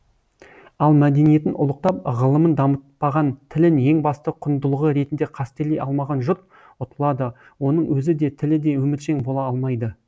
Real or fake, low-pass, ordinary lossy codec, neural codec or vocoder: real; none; none; none